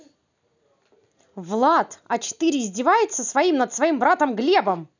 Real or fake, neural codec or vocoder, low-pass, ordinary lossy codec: real; none; 7.2 kHz; none